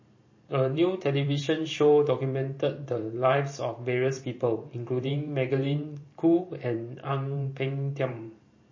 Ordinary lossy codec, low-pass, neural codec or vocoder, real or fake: MP3, 32 kbps; 7.2 kHz; vocoder, 44.1 kHz, 128 mel bands every 512 samples, BigVGAN v2; fake